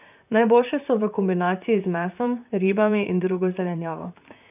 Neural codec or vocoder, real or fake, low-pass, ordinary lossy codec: vocoder, 44.1 kHz, 128 mel bands, Pupu-Vocoder; fake; 3.6 kHz; none